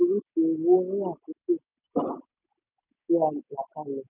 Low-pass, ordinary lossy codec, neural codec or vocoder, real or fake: 3.6 kHz; none; none; real